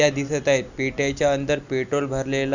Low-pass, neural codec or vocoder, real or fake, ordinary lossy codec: 7.2 kHz; none; real; none